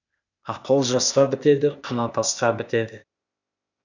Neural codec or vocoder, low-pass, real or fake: codec, 16 kHz, 0.8 kbps, ZipCodec; 7.2 kHz; fake